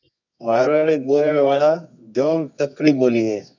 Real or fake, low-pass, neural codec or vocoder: fake; 7.2 kHz; codec, 24 kHz, 0.9 kbps, WavTokenizer, medium music audio release